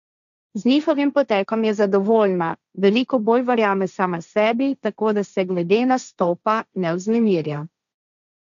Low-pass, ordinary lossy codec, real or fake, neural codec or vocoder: 7.2 kHz; MP3, 96 kbps; fake; codec, 16 kHz, 1.1 kbps, Voila-Tokenizer